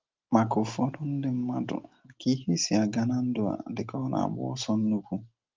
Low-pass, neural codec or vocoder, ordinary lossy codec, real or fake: 7.2 kHz; none; Opus, 32 kbps; real